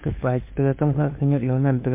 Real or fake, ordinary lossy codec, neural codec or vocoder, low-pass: fake; MP3, 24 kbps; codec, 16 kHz, 2 kbps, FunCodec, trained on LibriTTS, 25 frames a second; 3.6 kHz